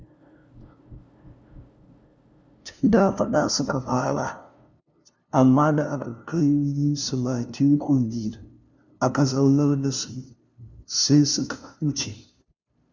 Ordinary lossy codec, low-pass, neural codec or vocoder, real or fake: none; none; codec, 16 kHz, 0.5 kbps, FunCodec, trained on LibriTTS, 25 frames a second; fake